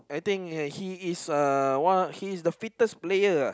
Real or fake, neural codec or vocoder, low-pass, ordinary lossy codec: real; none; none; none